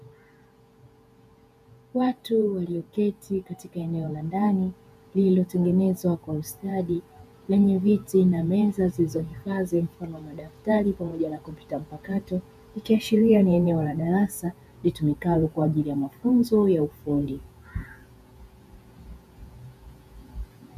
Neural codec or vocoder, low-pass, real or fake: vocoder, 48 kHz, 128 mel bands, Vocos; 14.4 kHz; fake